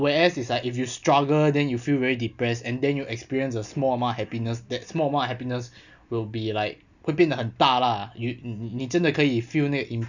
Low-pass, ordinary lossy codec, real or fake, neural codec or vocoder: 7.2 kHz; none; real; none